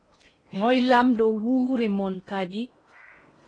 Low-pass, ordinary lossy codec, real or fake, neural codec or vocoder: 9.9 kHz; AAC, 32 kbps; fake; codec, 16 kHz in and 24 kHz out, 0.8 kbps, FocalCodec, streaming, 65536 codes